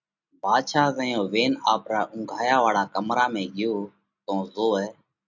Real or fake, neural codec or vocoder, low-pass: real; none; 7.2 kHz